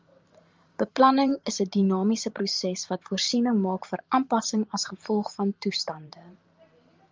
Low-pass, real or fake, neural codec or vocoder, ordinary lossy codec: 7.2 kHz; fake; vocoder, 44.1 kHz, 128 mel bands every 512 samples, BigVGAN v2; Opus, 32 kbps